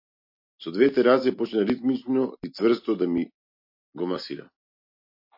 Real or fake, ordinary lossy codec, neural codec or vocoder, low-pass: real; MP3, 32 kbps; none; 5.4 kHz